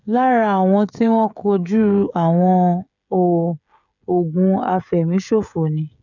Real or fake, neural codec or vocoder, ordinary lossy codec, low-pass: fake; codec, 16 kHz, 16 kbps, FreqCodec, smaller model; none; 7.2 kHz